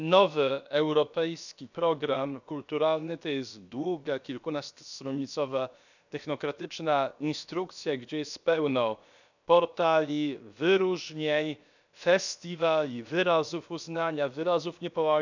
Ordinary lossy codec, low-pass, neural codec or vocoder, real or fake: none; 7.2 kHz; codec, 16 kHz, about 1 kbps, DyCAST, with the encoder's durations; fake